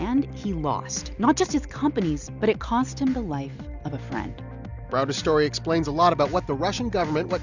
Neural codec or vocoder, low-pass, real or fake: none; 7.2 kHz; real